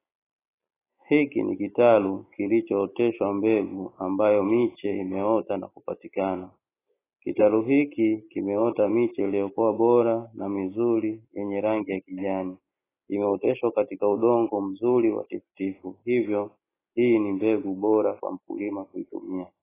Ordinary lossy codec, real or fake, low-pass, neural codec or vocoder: AAC, 16 kbps; real; 3.6 kHz; none